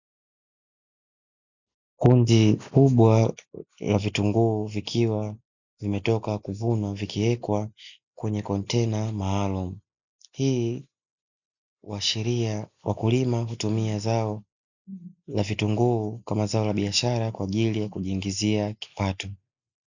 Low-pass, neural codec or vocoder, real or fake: 7.2 kHz; none; real